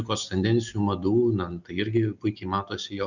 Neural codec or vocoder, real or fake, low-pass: none; real; 7.2 kHz